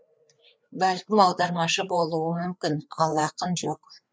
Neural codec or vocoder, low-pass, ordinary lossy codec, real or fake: codec, 16 kHz, 4 kbps, FreqCodec, larger model; none; none; fake